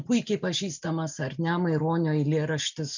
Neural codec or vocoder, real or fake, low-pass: none; real; 7.2 kHz